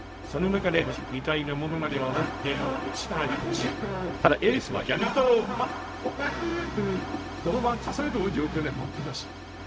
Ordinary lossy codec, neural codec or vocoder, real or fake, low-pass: none; codec, 16 kHz, 0.4 kbps, LongCat-Audio-Codec; fake; none